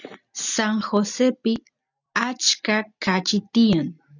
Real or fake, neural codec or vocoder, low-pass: real; none; 7.2 kHz